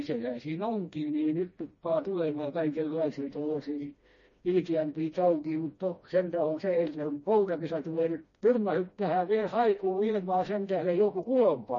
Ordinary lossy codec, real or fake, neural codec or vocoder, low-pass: MP3, 32 kbps; fake; codec, 16 kHz, 1 kbps, FreqCodec, smaller model; 7.2 kHz